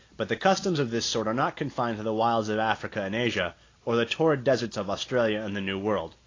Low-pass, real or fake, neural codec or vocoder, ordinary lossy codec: 7.2 kHz; real; none; AAC, 32 kbps